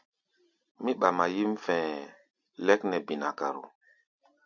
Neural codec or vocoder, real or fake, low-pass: none; real; 7.2 kHz